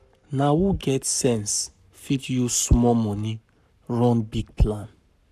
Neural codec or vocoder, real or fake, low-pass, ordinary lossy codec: codec, 44.1 kHz, 7.8 kbps, Pupu-Codec; fake; 14.4 kHz; none